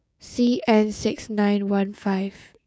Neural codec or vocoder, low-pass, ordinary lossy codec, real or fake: codec, 16 kHz, 6 kbps, DAC; none; none; fake